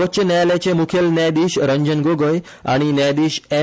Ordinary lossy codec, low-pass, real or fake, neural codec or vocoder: none; none; real; none